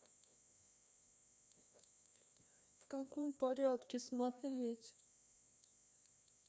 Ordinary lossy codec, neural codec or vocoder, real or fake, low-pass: none; codec, 16 kHz, 1 kbps, FreqCodec, larger model; fake; none